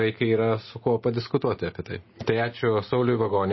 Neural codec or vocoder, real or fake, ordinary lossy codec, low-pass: none; real; MP3, 24 kbps; 7.2 kHz